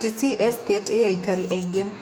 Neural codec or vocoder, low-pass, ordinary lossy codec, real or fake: codec, 44.1 kHz, 2.6 kbps, DAC; none; none; fake